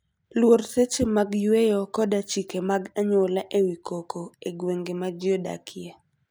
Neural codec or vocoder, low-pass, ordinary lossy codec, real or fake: none; none; none; real